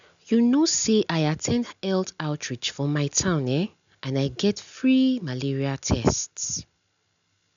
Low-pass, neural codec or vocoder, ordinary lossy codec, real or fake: 7.2 kHz; none; none; real